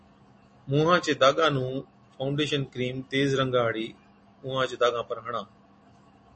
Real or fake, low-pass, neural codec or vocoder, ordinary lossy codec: real; 10.8 kHz; none; MP3, 32 kbps